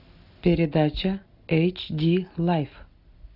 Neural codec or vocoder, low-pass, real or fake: none; 5.4 kHz; real